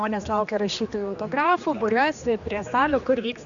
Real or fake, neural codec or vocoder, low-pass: fake; codec, 16 kHz, 2 kbps, X-Codec, HuBERT features, trained on general audio; 7.2 kHz